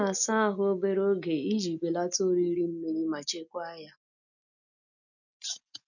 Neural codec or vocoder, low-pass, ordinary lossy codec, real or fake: none; 7.2 kHz; none; real